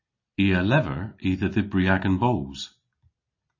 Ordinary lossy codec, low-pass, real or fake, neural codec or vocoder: MP3, 32 kbps; 7.2 kHz; real; none